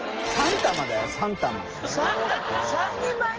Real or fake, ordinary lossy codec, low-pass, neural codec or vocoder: real; Opus, 16 kbps; 7.2 kHz; none